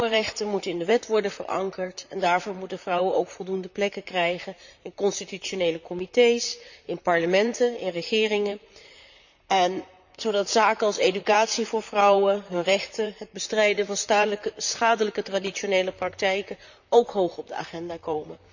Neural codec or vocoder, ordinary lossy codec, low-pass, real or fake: vocoder, 44.1 kHz, 128 mel bands, Pupu-Vocoder; none; 7.2 kHz; fake